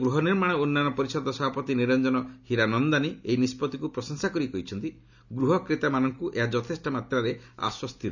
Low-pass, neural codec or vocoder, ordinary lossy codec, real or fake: 7.2 kHz; none; none; real